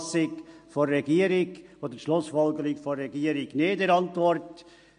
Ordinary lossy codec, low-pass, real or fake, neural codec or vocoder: MP3, 48 kbps; 9.9 kHz; real; none